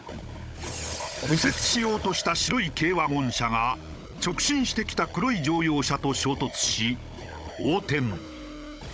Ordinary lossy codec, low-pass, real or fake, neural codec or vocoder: none; none; fake; codec, 16 kHz, 16 kbps, FunCodec, trained on Chinese and English, 50 frames a second